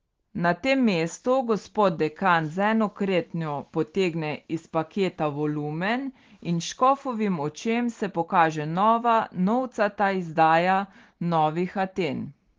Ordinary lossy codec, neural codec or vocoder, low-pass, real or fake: Opus, 16 kbps; none; 7.2 kHz; real